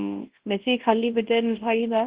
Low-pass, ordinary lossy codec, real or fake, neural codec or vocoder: 3.6 kHz; Opus, 16 kbps; fake; codec, 24 kHz, 0.9 kbps, WavTokenizer, large speech release